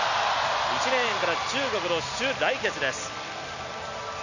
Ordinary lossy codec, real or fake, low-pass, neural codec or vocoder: none; real; 7.2 kHz; none